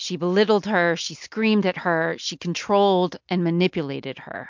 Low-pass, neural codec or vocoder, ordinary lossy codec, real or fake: 7.2 kHz; none; MP3, 64 kbps; real